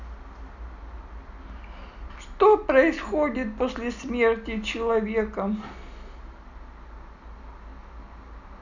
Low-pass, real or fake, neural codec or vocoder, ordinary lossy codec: 7.2 kHz; real; none; none